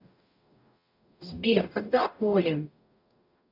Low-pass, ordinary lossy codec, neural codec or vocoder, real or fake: 5.4 kHz; none; codec, 44.1 kHz, 0.9 kbps, DAC; fake